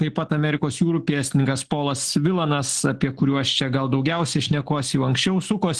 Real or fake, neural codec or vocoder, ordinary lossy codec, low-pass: real; none; Opus, 16 kbps; 10.8 kHz